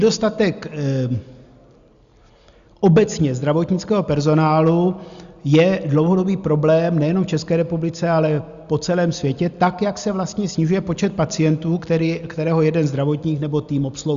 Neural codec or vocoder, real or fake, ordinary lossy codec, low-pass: none; real; Opus, 64 kbps; 7.2 kHz